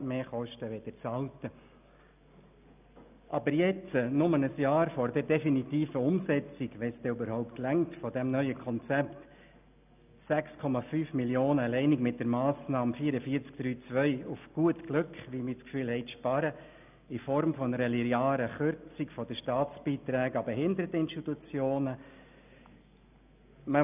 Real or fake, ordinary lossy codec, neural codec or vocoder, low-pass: real; none; none; 3.6 kHz